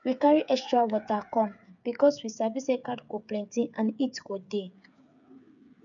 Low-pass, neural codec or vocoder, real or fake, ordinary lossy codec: 7.2 kHz; codec, 16 kHz, 8 kbps, FreqCodec, smaller model; fake; none